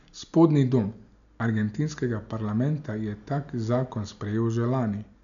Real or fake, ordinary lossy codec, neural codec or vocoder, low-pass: real; none; none; 7.2 kHz